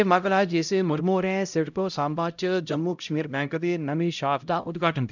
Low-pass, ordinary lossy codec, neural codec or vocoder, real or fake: 7.2 kHz; none; codec, 16 kHz, 0.5 kbps, X-Codec, HuBERT features, trained on LibriSpeech; fake